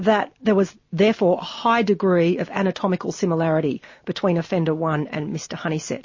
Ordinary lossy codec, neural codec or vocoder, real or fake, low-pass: MP3, 32 kbps; none; real; 7.2 kHz